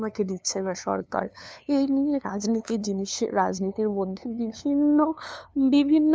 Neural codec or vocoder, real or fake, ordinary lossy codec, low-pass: codec, 16 kHz, 2 kbps, FunCodec, trained on LibriTTS, 25 frames a second; fake; none; none